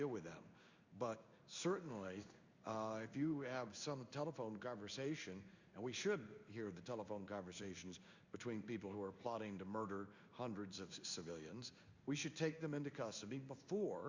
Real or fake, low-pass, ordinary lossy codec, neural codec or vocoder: fake; 7.2 kHz; Opus, 64 kbps; codec, 16 kHz in and 24 kHz out, 1 kbps, XY-Tokenizer